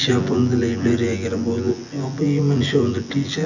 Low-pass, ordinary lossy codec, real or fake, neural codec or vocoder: 7.2 kHz; none; fake; vocoder, 24 kHz, 100 mel bands, Vocos